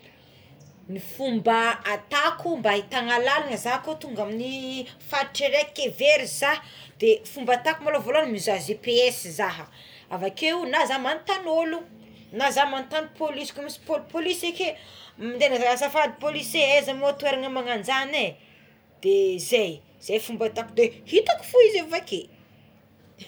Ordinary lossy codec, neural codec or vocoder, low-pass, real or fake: none; none; none; real